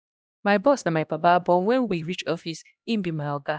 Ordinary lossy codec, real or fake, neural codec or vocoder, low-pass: none; fake; codec, 16 kHz, 1 kbps, X-Codec, HuBERT features, trained on LibriSpeech; none